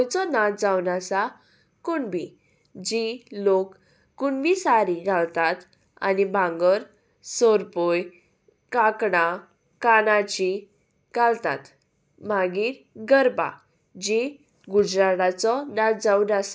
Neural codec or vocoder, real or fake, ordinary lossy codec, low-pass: none; real; none; none